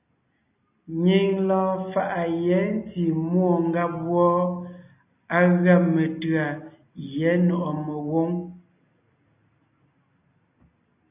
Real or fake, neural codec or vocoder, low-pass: real; none; 3.6 kHz